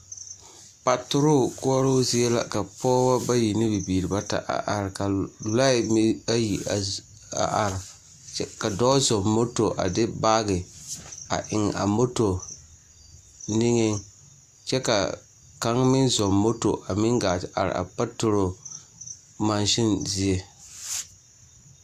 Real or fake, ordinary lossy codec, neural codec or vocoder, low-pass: real; AAC, 96 kbps; none; 14.4 kHz